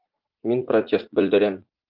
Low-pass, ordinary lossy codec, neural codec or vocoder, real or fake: 5.4 kHz; Opus, 32 kbps; vocoder, 44.1 kHz, 80 mel bands, Vocos; fake